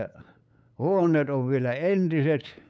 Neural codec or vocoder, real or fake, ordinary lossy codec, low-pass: codec, 16 kHz, 8 kbps, FunCodec, trained on LibriTTS, 25 frames a second; fake; none; none